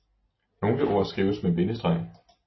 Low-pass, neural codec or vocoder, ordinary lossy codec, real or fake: 7.2 kHz; none; MP3, 24 kbps; real